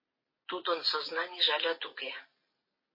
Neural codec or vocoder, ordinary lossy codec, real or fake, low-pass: none; MP3, 24 kbps; real; 5.4 kHz